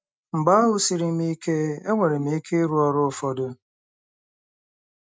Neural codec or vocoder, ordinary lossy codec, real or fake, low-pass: none; none; real; none